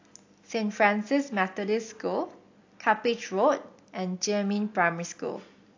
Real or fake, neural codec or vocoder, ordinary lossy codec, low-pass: real; none; AAC, 48 kbps; 7.2 kHz